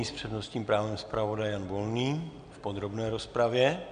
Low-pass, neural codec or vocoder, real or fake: 9.9 kHz; none; real